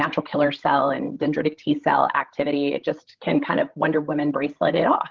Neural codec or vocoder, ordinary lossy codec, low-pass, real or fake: codec, 16 kHz, 16 kbps, FreqCodec, larger model; Opus, 16 kbps; 7.2 kHz; fake